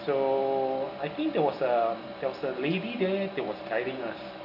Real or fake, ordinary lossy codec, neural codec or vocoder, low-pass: real; AAC, 48 kbps; none; 5.4 kHz